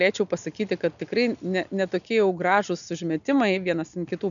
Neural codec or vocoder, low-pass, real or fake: none; 7.2 kHz; real